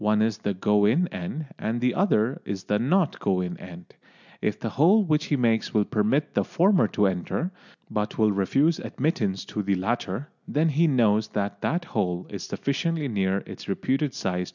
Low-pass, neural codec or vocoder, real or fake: 7.2 kHz; none; real